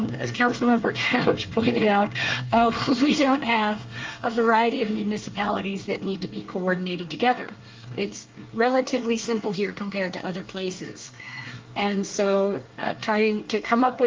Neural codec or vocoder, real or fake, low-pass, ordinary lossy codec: codec, 24 kHz, 1 kbps, SNAC; fake; 7.2 kHz; Opus, 32 kbps